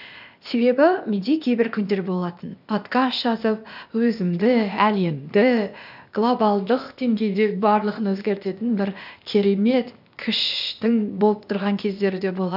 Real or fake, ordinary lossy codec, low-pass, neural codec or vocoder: fake; none; 5.4 kHz; codec, 16 kHz, 0.8 kbps, ZipCodec